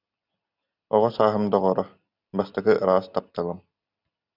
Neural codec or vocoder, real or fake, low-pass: none; real; 5.4 kHz